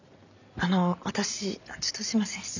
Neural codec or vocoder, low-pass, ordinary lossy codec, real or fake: none; 7.2 kHz; none; real